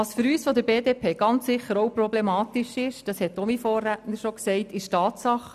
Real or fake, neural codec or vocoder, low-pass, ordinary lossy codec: real; none; 14.4 kHz; none